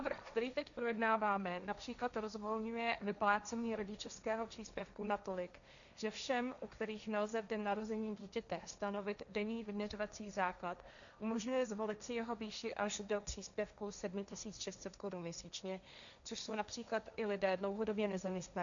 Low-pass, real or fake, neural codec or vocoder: 7.2 kHz; fake; codec, 16 kHz, 1.1 kbps, Voila-Tokenizer